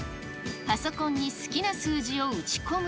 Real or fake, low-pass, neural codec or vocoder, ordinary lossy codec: real; none; none; none